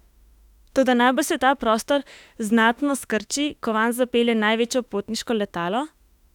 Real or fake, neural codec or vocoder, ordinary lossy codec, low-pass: fake; autoencoder, 48 kHz, 32 numbers a frame, DAC-VAE, trained on Japanese speech; none; 19.8 kHz